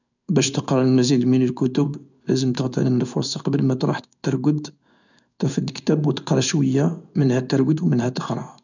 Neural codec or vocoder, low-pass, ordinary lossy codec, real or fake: codec, 16 kHz in and 24 kHz out, 1 kbps, XY-Tokenizer; 7.2 kHz; none; fake